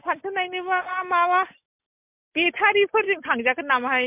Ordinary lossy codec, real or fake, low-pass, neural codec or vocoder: MP3, 32 kbps; real; 3.6 kHz; none